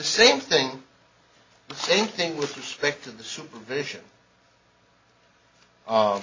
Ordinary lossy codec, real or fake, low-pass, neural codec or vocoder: MP3, 32 kbps; real; 7.2 kHz; none